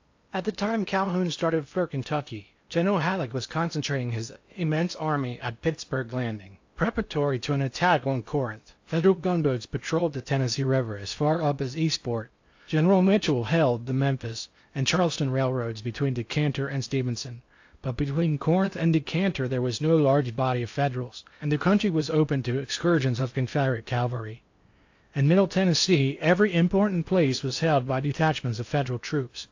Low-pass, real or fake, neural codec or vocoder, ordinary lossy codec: 7.2 kHz; fake; codec, 16 kHz in and 24 kHz out, 0.8 kbps, FocalCodec, streaming, 65536 codes; AAC, 48 kbps